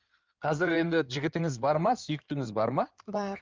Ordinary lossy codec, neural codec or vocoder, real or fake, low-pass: Opus, 32 kbps; codec, 16 kHz in and 24 kHz out, 2.2 kbps, FireRedTTS-2 codec; fake; 7.2 kHz